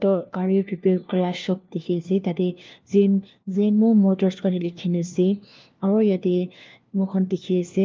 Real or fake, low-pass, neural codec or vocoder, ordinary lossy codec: fake; 7.2 kHz; codec, 16 kHz, 1 kbps, FunCodec, trained on LibriTTS, 50 frames a second; Opus, 24 kbps